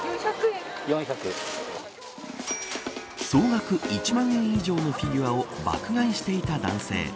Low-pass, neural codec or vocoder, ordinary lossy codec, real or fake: none; none; none; real